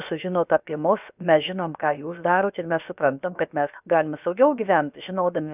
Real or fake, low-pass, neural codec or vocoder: fake; 3.6 kHz; codec, 16 kHz, about 1 kbps, DyCAST, with the encoder's durations